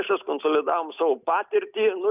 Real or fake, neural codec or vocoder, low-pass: real; none; 3.6 kHz